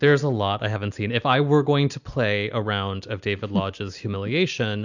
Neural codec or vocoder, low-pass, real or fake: none; 7.2 kHz; real